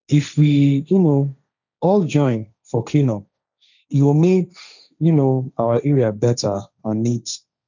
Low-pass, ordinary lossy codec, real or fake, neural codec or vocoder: 7.2 kHz; none; fake; codec, 16 kHz, 1.1 kbps, Voila-Tokenizer